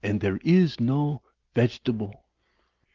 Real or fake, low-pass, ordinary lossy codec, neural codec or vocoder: real; 7.2 kHz; Opus, 32 kbps; none